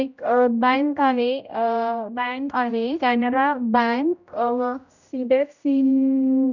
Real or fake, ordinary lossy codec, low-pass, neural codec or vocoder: fake; none; 7.2 kHz; codec, 16 kHz, 0.5 kbps, X-Codec, HuBERT features, trained on general audio